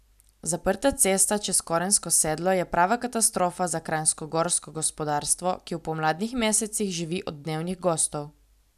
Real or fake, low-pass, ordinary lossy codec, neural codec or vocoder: real; 14.4 kHz; none; none